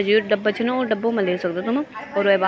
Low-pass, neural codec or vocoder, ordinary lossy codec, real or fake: none; none; none; real